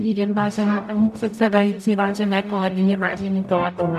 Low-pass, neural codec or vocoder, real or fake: 14.4 kHz; codec, 44.1 kHz, 0.9 kbps, DAC; fake